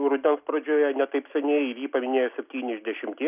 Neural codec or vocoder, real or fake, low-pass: none; real; 3.6 kHz